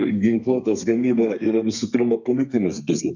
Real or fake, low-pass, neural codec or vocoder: fake; 7.2 kHz; codec, 32 kHz, 1.9 kbps, SNAC